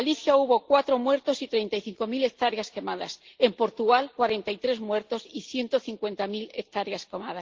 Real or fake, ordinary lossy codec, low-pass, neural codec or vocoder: real; Opus, 16 kbps; 7.2 kHz; none